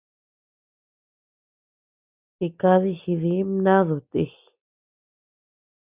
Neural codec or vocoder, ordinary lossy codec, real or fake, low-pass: none; Opus, 64 kbps; real; 3.6 kHz